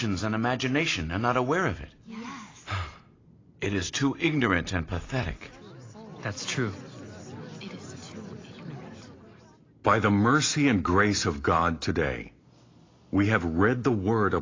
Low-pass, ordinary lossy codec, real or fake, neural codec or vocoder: 7.2 kHz; AAC, 32 kbps; real; none